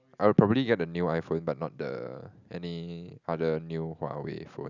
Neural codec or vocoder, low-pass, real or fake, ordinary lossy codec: none; 7.2 kHz; real; none